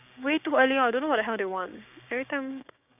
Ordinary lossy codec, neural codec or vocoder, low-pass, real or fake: none; codec, 16 kHz, 6 kbps, DAC; 3.6 kHz; fake